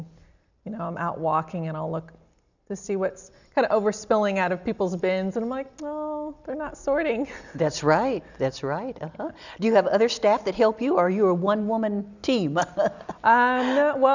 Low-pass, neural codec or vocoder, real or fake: 7.2 kHz; none; real